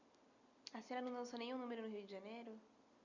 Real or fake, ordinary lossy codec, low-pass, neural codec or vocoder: real; none; 7.2 kHz; none